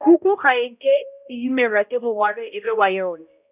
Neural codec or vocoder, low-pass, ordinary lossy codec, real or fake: codec, 16 kHz, 0.5 kbps, X-Codec, HuBERT features, trained on balanced general audio; 3.6 kHz; none; fake